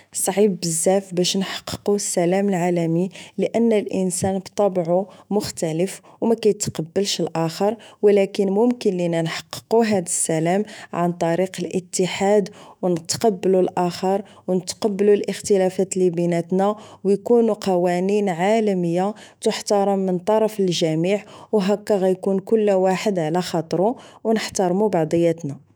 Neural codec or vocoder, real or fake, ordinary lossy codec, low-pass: autoencoder, 48 kHz, 128 numbers a frame, DAC-VAE, trained on Japanese speech; fake; none; none